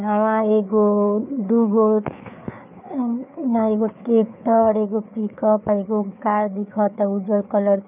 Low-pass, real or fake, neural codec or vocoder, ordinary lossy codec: 3.6 kHz; fake; codec, 16 kHz, 4 kbps, FreqCodec, larger model; none